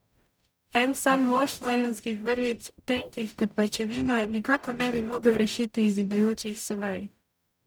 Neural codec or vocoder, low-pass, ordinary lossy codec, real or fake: codec, 44.1 kHz, 0.9 kbps, DAC; none; none; fake